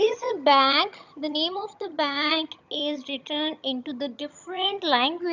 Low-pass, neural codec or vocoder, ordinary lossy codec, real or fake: 7.2 kHz; vocoder, 22.05 kHz, 80 mel bands, HiFi-GAN; none; fake